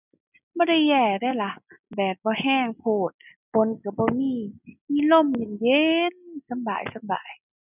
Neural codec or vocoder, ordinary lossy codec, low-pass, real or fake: none; none; 3.6 kHz; real